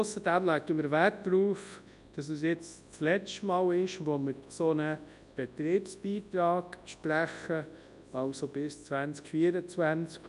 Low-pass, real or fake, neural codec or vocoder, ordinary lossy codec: 10.8 kHz; fake; codec, 24 kHz, 0.9 kbps, WavTokenizer, large speech release; none